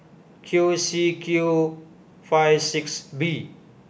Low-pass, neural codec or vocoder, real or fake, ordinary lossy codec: none; none; real; none